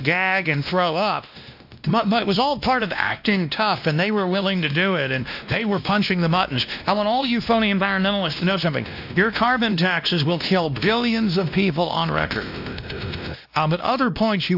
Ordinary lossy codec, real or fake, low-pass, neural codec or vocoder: AAC, 48 kbps; fake; 5.4 kHz; codec, 16 kHz, 1 kbps, X-Codec, WavLM features, trained on Multilingual LibriSpeech